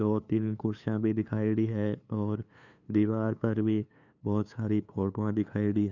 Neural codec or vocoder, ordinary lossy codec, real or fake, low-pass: codec, 16 kHz, 2 kbps, FunCodec, trained on LibriTTS, 25 frames a second; none; fake; 7.2 kHz